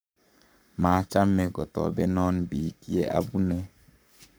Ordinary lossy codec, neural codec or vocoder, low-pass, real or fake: none; codec, 44.1 kHz, 7.8 kbps, Pupu-Codec; none; fake